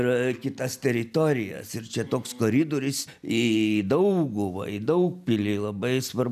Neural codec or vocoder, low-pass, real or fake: none; 14.4 kHz; real